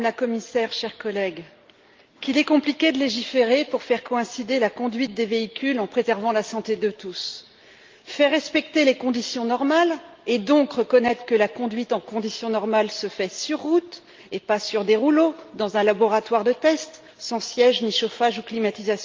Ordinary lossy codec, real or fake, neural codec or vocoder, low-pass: Opus, 32 kbps; real; none; 7.2 kHz